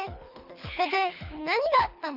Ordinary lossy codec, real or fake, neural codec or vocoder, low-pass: none; fake; codec, 24 kHz, 3 kbps, HILCodec; 5.4 kHz